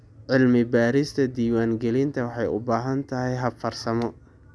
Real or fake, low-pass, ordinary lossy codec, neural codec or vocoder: real; none; none; none